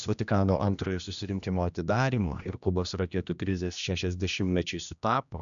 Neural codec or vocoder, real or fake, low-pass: codec, 16 kHz, 1 kbps, X-Codec, HuBERT features, trained on general audio; fake; 7.2 kHz